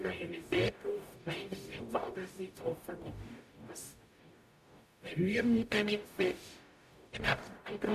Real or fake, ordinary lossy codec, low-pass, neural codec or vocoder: fake; AAC, 96 kbps; 14.4 kHz; codec, 44.1 kHz, 0.9 kbps, DAC